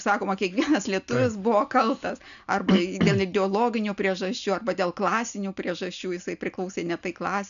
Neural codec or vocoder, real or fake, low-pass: none; real; 7.2 kHz